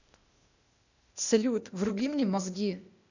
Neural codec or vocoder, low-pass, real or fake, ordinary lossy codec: codec, 16 kHz, 0.8 kbps, ZipCodec; 7.2 kHz; fake; none